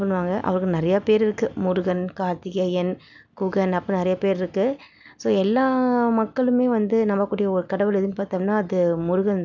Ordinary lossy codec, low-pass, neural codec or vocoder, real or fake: none; 7.2 kHz; none; real